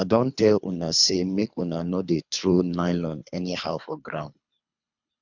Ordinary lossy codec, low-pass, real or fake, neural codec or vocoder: none; 7.2 kHz; fake; codec, 24 kHz, 3 kbps, HILCodec